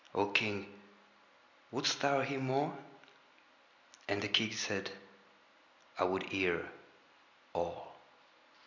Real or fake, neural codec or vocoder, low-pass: real; none; 7.2 kHz